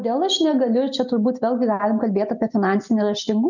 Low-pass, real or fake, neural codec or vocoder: 7.2 kHz; real; none